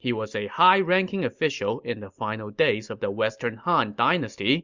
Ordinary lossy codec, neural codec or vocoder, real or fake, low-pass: Opus, 64 kbps; none; real; 7.2 kHz